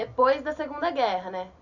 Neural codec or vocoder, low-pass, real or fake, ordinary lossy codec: none; 7.2 kHz; real; none